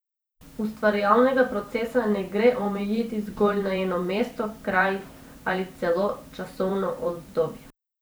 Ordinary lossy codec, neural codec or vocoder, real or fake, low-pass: none; vocoder, 44.1 kHz, 128 mel bands every 512 samples, BigVGAN v2; fake; none